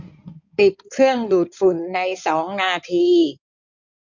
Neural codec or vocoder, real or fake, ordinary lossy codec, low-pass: codec, 16 kHz in and 24 kHz out, 2.2 kbps, FireRedTTS-2 codec; fake; none; 7.2 kHz